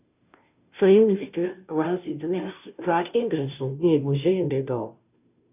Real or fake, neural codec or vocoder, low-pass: fake; codec, 16 kHz, 0.5 kbps, FunCodec, trained on Chinese and English, 25 frames a second; 3.6 kHz